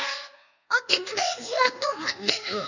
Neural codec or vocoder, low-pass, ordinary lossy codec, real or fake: codec, 24 kHz, 1.2 kbps, DualCodec; 7.2 kHz; none; fake